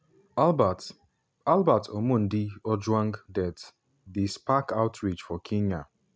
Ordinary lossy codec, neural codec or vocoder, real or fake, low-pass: none; none; real; none